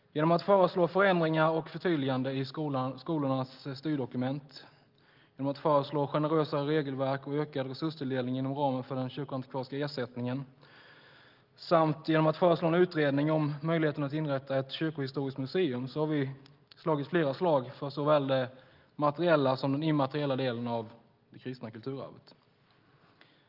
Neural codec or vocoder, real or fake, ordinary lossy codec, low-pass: none; real; Opus, 32 kbps; 5.4 kHz